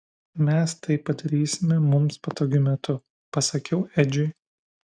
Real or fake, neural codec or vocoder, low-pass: real; none; 9.9 kHz